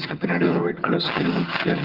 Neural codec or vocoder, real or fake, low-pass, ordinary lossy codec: vocoder, 22.05 kHz, 80 mel bands, HiFi-GAN; fake; 5.4 kHz; Opus, 16 kbps